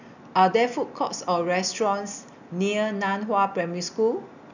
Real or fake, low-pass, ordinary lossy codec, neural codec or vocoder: real; 7.2 kHz; none; none